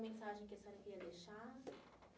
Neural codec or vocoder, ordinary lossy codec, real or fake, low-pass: none; none; real; none